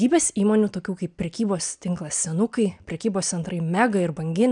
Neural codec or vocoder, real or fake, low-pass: none; real; 9.9 kHz